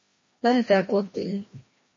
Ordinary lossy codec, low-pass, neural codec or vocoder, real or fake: MP3, 32 kbps; 7.2 kHz; codec, 16 kHz, 1 kbps, FreqCodec, larger model; fake